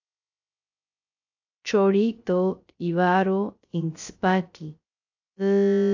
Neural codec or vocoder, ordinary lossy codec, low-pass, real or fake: codec, 16 kHz, 0.3 kbps, FocalCodec; AAC, 48 kbps; 7.2 kHz; fake